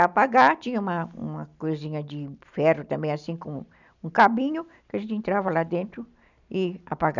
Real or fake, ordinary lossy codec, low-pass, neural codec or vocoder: real; none; 7.2 kHz; none